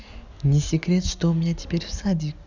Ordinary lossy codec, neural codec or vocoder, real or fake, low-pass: none; none; real; 7.2 kHz